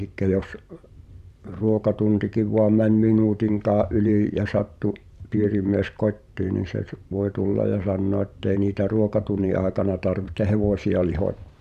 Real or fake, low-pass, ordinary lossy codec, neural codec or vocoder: fake; 14.4 kHz; none; vocoder, 44.1 kHz, 128 mel bands every 512 samples, BigVGAN v2